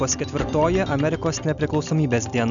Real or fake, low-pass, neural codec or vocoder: real; 7.2 kHz; none